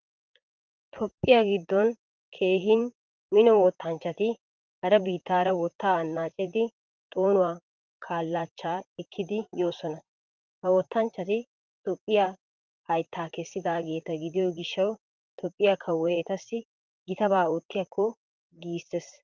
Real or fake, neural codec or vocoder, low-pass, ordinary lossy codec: fake; vocoder, 44.1 kHz, 128 mel bands, Pupu-Vocoder; 7.2 kHz; Opus, 24 kbps